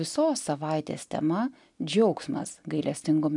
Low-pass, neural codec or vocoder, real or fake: 10.8 kHz; none; real